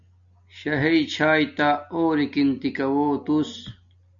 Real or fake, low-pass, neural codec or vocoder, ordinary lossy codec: real; 7.2 kHz; none; MP3, 96 kbps